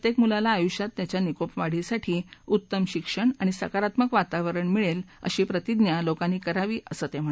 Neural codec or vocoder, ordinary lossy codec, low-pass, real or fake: none; none; none; real